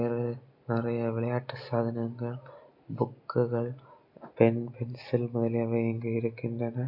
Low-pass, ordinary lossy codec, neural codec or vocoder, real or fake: 5.4 kHz; MP3, 48 kbps; none; real